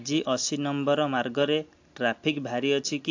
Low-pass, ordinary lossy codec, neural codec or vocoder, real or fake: 7.2 kHz; AAC, 48 kbps; none; real